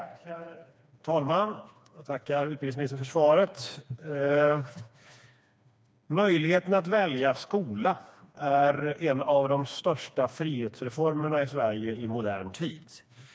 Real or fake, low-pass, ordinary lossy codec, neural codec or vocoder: fake; none; none; codec, 16 kHz, 2 kbps, FreqCodec, smaller model